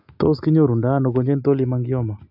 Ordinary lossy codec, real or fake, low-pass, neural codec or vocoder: AAC, 48 kbps; real; 5.4 kHz; none